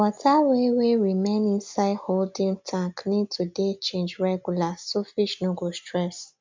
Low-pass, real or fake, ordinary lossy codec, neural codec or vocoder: 7.2 kHz; real; MP3, 64 kbps; none